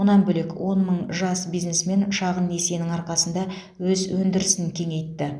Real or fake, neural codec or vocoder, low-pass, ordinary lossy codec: real; none; none; none